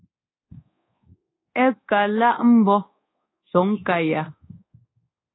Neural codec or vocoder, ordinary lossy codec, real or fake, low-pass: codec, 24 kHz, 1.2 kbps, DualCodec; AAC, 16 kbps; fake; 7.2 kHz